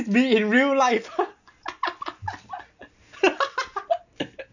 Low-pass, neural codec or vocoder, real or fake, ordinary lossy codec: 7.2 kHz; none; real; none